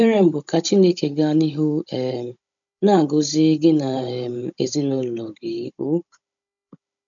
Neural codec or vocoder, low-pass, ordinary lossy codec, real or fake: codec, 16 kHz, 16 kbps, FunCodec, trained on Chinese and English, 50 frames a second; 7.2 kHz; none; fake